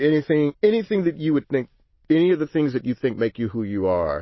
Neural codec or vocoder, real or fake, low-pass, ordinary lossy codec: none; real; 7.2 kHz; MP3, 24 kbps